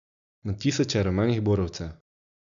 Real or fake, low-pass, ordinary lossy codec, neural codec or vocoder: real; 7.2 kHz; none; none